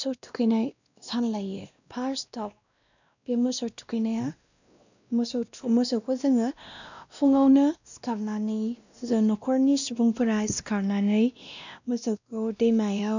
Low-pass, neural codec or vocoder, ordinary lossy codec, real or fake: 7.2 kHz; codec, 16 kHz, 1 kbps, X-Codec, WavLM features, trained on Multilingual LibriSpeech; none; fake